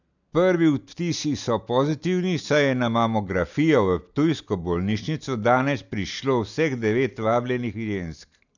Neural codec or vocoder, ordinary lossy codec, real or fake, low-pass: none; none; real; 7.2 kHz